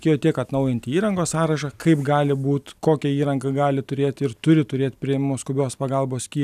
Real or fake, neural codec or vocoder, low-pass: real; none; 14.4 kHz